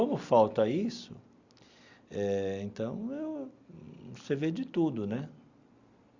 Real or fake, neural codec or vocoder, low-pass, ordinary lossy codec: real; none; 7.2 kHz; Opus, 64 kbps